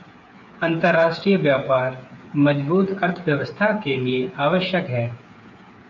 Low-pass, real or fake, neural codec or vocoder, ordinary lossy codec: 7.2 kHz; fake; codec, 16 kHz, 8 kbps, FreqCodec, smaller model; AAC, 48 kbps